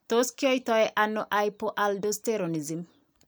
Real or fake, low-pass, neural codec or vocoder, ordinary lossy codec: real; none; none; none